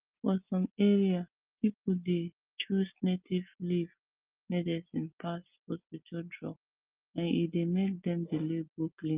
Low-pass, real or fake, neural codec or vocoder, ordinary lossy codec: 3.6 kHz; real; none; Opus, 32 kbps